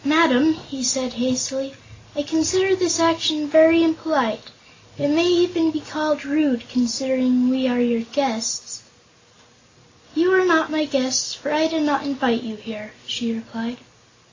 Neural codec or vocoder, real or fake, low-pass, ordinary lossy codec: none; real; 7.2 kHz; AAC, 32 kbps